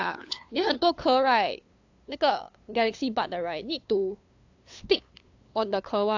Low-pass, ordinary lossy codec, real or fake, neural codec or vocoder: 7.2 kHz; AAC, 48 kbps; fake; codec, 16 kHz, 2 kbps, FunCodec, trained on LibriTTS, 25 frames a second